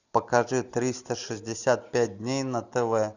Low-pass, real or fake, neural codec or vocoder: 7.2 kHz; real; none